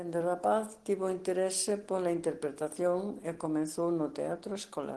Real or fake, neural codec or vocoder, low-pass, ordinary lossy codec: real; none; 10.8 kHz; Opus, 16 kbps